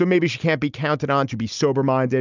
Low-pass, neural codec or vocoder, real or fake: 7.2 kHz; none; real